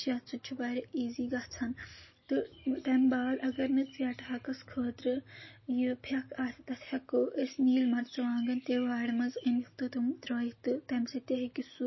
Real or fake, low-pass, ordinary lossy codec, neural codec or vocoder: real; 7.2 kHz; MP3, 24 kbps; none